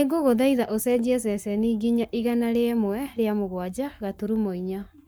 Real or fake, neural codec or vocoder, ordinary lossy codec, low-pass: fake; codec, 44.1 kHz, 7.8 kbps, Pupu-Codec; none; none